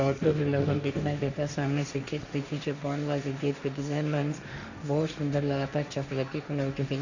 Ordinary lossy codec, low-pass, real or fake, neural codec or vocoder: none; 7.2 kHz; fake; codec, 16 kHz, 1.1 kbps, Voila-Tokenizer